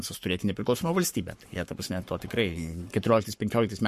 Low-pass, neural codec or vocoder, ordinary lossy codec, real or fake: 14.4 kHz; codec, 44.1 kHz, 7.8 kbps, Pupu-Codec; MP3, 64 kbps; fake